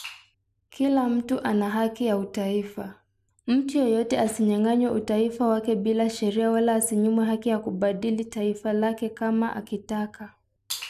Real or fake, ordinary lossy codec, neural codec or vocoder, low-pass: real; none; none; 14.4 kHz